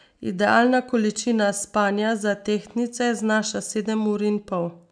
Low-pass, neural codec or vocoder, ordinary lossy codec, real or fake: 9.9 kHz; none; none; real